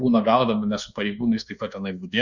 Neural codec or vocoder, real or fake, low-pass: codec, 24 kHz, 1.2 kbps, DualCodec; fake; 7.2 kHz